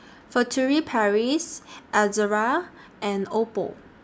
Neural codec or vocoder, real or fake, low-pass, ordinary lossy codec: none; real; none; none